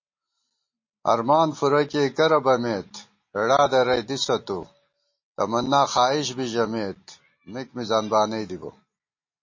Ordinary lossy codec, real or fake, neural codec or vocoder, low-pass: MP3, 32 kbps; real; none; 7.2 kHz